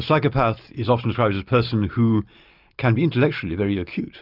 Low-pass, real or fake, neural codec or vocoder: 5.4 kHz; real; none